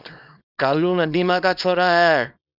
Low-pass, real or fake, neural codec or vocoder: 5.4 kHz; fake; codec, 24 kHz, 0.9 kbps, WavTokenizer, small release